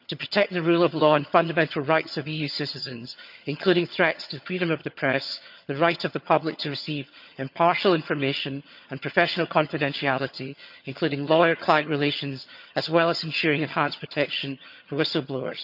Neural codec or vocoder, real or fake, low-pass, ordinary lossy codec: vocoder, 22.05 kHz, 80 mel bands, HiFi-GAN; fake; 5.4 kHz; none